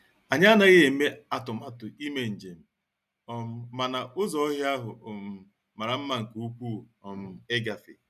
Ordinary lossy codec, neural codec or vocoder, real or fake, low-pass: none; none; real; 14.4 kHz